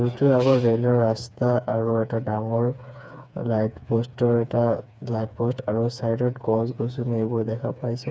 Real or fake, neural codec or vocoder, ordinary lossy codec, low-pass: fake; codec, 16 kHz, 4 kbps, FreqCodec, smaller model; none; none